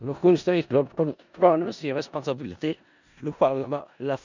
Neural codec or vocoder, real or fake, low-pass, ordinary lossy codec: codec, 16 kHz in and 24 kHz out, 0.4 kbps, LongCat-Audio-Codec, four codebook decoder; fake; 7.2 kHz; none